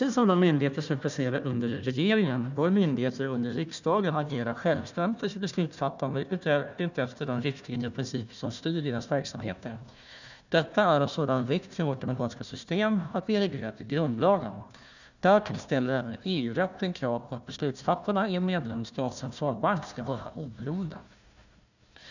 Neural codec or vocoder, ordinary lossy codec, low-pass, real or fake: codec, 16 kHz, 1 kbps, FunCodec, trained on Chinese and English, 50 frames a second; none; 7.2 kHz; fake